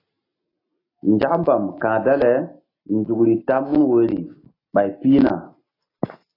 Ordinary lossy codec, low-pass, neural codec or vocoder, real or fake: AAC, 24 kbps; 5.4 kHz; none; real